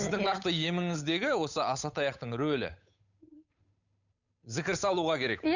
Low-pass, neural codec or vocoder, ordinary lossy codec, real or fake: 7.2 kHz; codec, 16 kHz, 8 kbps, FunCodec, trained on Chinese and English, 25 frames a second; none; fake